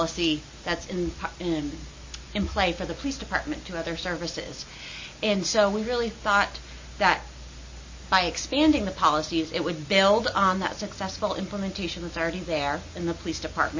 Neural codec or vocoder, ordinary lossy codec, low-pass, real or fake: none; MP3, 32 kbps; 7.2 kHz; real